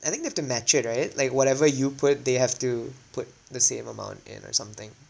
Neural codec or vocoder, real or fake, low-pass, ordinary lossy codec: none; real; none; none